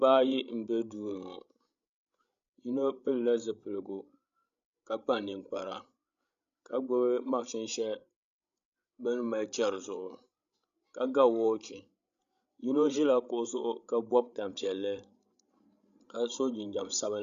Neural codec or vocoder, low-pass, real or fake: codec, 16 kHz, 16 kbps, FreqCodec, larger model; 7.2 kHz; fake